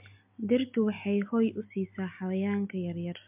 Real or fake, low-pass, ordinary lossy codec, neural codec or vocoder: real; 3.6 kHz; MP3, 32 kbps; none